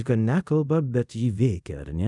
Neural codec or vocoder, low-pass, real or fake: codec, 24 kHz, 0.5 kbps, DualCodec; 10.8 kHz; fake